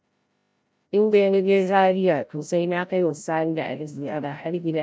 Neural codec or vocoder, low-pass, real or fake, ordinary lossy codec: codec, 16 kHz, 0.5 kbps, FreqCodec, larger model; none; fake; none